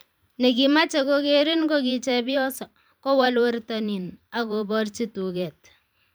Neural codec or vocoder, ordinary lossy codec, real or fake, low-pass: vocoder, 44.1 kHz, 128 mel bands every 512 samples, BigVGAN v2; none; fake; none